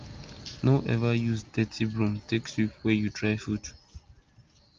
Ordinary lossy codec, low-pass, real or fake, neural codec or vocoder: Opus, 24 kbps; 7.2 kHz; real; none